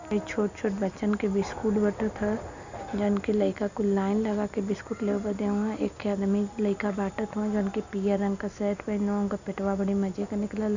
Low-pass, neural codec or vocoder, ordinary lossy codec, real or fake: 7.2 kHz; none; none; real